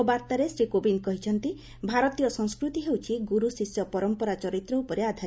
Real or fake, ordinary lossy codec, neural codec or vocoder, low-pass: real; none; none; none